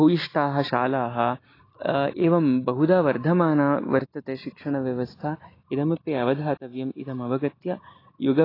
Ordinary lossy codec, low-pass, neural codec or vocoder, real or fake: AAC, 24 kbps; 5.4 kHz; none; real